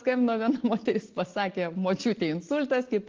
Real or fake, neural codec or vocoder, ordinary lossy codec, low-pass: real; none; Opus, 16 kbps; 7.2 kHz